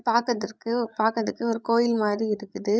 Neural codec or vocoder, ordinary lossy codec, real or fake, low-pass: codec, 16 kHz, 16 kbps, FreqCodec, larger model; none; fake; none